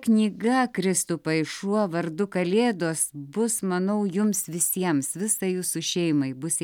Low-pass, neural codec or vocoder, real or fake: 19.8 kHz; none; real